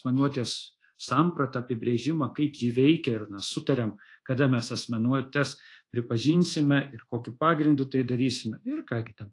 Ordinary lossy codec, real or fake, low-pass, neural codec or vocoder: AAC, 48 kbps; fake; 10.8 kHz; codec, 24 kHz, 1.2 kbps, DualCodec